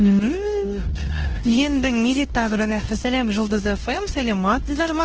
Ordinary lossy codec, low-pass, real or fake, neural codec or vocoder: Opus, 16 kbps; 7.2 kHz; fake; codec, 16 kHz, 1 kbps, X-Codec, WavLM features, trained on Multilingual LibriSpeech